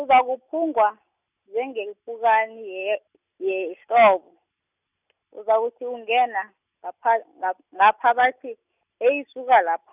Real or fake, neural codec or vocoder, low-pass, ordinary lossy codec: real; none; 3.6 kHz; none